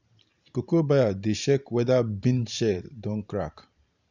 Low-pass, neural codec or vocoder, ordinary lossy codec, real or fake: 7.2 kHz; vocoder, 44.1 kHz, 128 mel bands every 512 samples, BigVGAN v2; none; fake